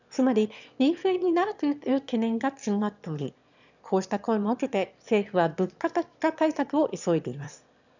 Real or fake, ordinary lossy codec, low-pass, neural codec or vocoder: fake; none; 7.2 kHz; autoencoder, 22.05 kHz, a latent of 192 numbers a frame, VITS, trained on one speaker